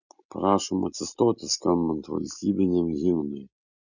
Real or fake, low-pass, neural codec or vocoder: real; 7.2 kHz; none